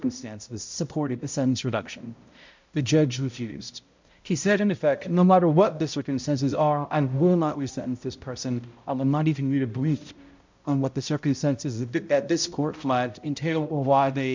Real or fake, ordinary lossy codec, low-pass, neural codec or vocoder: fake; MP3, 64 kbps; 7.2 kHz; codec, 16 kHz, 0.5 kbps, X-Codec, HuBERT features, trained on balanced general audio